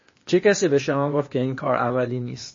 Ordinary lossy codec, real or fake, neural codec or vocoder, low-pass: MP3, 32 kbps; fake; codec, 16 kHz, 0.8 kbps, ZipCodec; 7.2 kHz